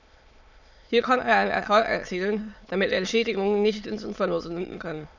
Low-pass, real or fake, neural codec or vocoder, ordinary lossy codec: 7.2 kHz; fake; autoencoder, 22.05 kHz, a latent of 192 numbers a frame, VITS, trained on many speakers; none